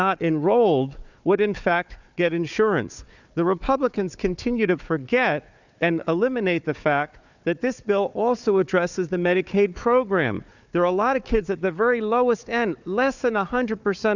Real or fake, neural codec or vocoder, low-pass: fake; codec, 16 kHz, 4 kbps, FunCodec, trained on Chinese and English, 50 frames a second; 7.2 kHz